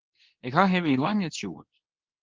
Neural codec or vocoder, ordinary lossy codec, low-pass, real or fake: codec, 24 kHz, 0.9 kbps, WavTokenizer, medium speech release version 2; Opus, 16 kbps; 7.2 kHz; fake